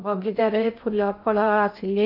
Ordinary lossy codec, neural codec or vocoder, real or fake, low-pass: none; codec, 16 kHz in and 24 kHz out, 0.6 kbps, FocalCodec, streaming, 2048 codes; fake; 5.4 kHz